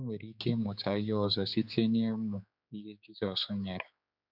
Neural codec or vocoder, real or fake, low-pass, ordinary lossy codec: codec, 16 kHz, 4 kbps, X-Codec, HuBERT features, trained on general audio; fake; 5.4 kHz; none